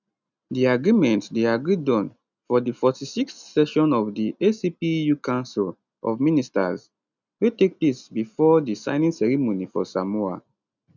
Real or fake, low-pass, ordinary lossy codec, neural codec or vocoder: real; 7.2 kHz; none; none